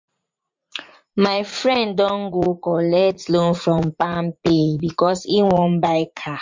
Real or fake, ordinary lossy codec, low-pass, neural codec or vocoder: fake; MP3, 48 kbps; 7.2 kHz; vocoder, 44.1 kHz, 80 mel bands, Vocos